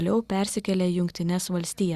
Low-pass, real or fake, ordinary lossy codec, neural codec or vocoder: 14.4 kHz; real; Opus, 64 kbps; none